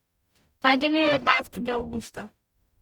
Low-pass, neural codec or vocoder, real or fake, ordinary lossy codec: 19.8 kHz; codec, 44.1 kHz, 0.9 kbps, DAC; fake; Opus, 64 kbps